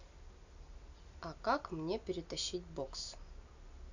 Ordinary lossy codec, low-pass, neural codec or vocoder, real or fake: none; 7.2 kHz; none; real